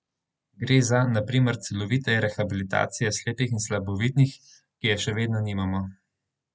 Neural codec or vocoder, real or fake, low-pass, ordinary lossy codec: none; real; none; none